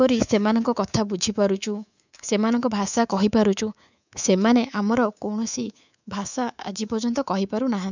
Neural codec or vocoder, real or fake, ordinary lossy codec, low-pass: codec, 24 kHz, 3.1 kbps, DualCodec; fake; none; 7.2 kHz